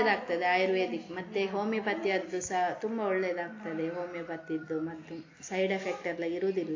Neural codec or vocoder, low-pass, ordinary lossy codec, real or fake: none; 7.2 kHz; AAC, 32 kbps; real